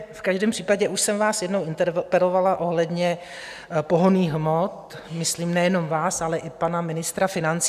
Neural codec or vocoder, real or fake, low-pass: none; real; 14.4 kHz